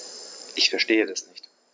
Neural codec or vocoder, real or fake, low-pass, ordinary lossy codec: none; real; none; none